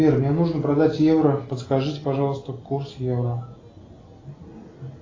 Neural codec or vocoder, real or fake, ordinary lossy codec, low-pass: none; real; AAC, 32 kbps; 7.2 kHz